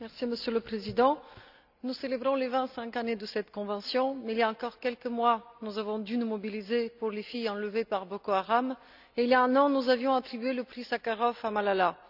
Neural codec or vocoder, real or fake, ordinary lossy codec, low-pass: none; real; none; 5.4 kHz